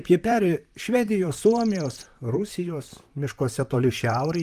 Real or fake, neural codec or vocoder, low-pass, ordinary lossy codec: fake; vocoder, 44.1 kHz, 128 mel bands, Pupu-Vocoder; 14.4 kHz; Opus, 32 kbps